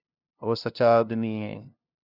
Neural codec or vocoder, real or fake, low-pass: codec, 16 kHz, 0.5 kbps, FunCodec, trained on LibriTTS, 25 frames a second; fake; 5.4 kHz